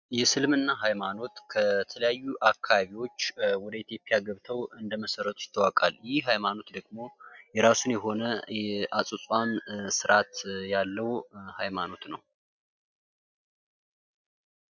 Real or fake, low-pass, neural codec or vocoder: real; 7.2 kHz; none